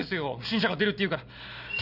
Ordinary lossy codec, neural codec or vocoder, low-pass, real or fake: none; none; 5.4 kHz; real